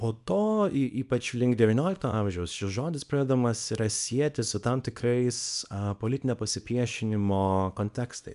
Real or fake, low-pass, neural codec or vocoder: fake; 10.8 kHz; codec, 24 kHz, 0.9 kbps, WavTokenizer, small release